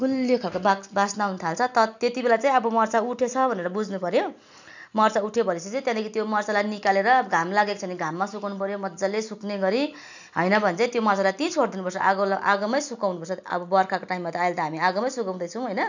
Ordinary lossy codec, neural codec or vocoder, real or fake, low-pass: none; none; real; 7.2 kHz